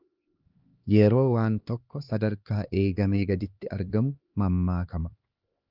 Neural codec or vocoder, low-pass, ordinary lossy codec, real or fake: codec, 16 kHz, 2 kbps, X-Codec, HuBERT features, trained on LibriSpeech; 5.4 kHz; Opus, 24 kbps; fake